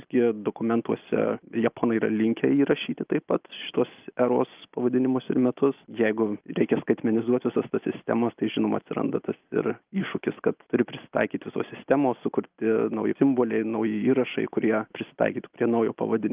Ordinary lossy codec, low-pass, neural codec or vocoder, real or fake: Opus, 24 kbps; 3.6 kHz; none; real